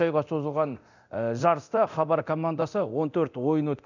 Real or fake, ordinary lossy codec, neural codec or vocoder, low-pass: fake; none; codec, 24 kHz, 0.9 kbps, DualCodec; 7.2 kHz